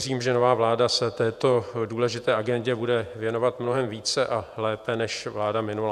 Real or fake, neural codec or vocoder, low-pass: fake; vocoder, 48 kHz, 128 mel bands, Vocos; 14.4 kHz